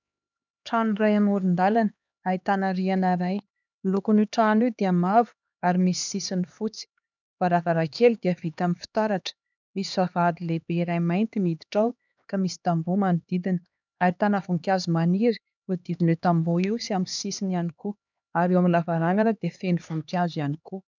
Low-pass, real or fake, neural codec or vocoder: 7.2 kHz; fake; codec, 16 kHz, 2 kbps, X-Codec, HuBERT features, trained on LibriSpeech